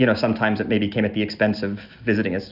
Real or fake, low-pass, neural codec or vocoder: real; 5.4 kHz; none